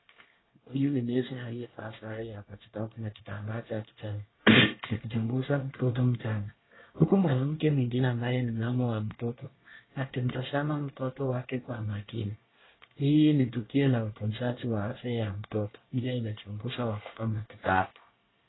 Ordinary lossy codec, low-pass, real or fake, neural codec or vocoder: AAC, 16 kbps; 7.2 kHz; fake; codec, 24 kHz, 1 kbps, SNAC